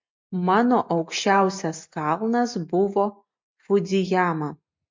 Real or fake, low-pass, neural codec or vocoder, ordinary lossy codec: real; 7.2 kHz; none; MP3, 48 kbps